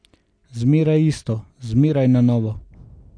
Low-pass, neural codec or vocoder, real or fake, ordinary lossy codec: 9.9 kHz; none; real; none